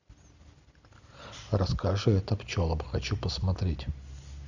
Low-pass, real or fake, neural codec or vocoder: 7.2 kHz; real; none